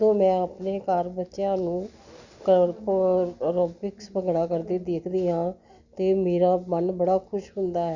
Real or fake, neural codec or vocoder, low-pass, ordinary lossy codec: real; none; 7.2 kHz; Opus, 64 kbps